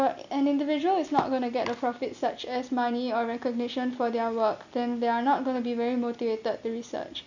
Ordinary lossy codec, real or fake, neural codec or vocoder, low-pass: none; real; none; 7.2 kHz